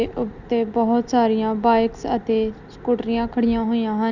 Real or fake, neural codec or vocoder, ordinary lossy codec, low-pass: real; none; none; 7.2 kHz